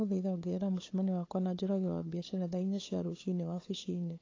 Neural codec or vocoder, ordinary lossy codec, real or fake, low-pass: codec, 16 kHz, 8 kbps, FunCodec, trained on LibriTTS, 25 frames a second; AAC, 32 kbps; fake; 7.2 kHz